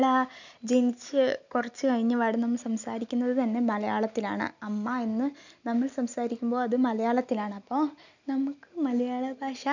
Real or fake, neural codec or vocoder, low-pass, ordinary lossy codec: real; none; 7.2 kHz; none